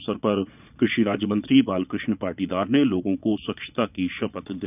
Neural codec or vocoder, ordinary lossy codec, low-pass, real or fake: vocoder, 22.05 kHz, 80 mel bands, Vocos; none; 3.6 kHz; fake